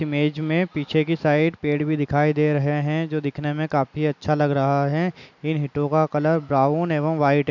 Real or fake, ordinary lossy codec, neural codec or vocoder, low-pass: real; none; none; 7.2 kHz